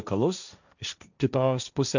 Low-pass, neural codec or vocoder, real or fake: 7.2 kHz; codec, 16 kHz, 1.1 kbps, Voila-Tokenizer; fake